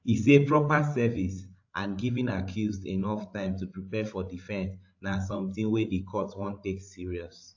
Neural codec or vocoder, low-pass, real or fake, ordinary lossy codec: codec, 16 kHz, 16 kbps, FreqCodec, larger model; 7.2 kHz; fake; AAC, 48 kbps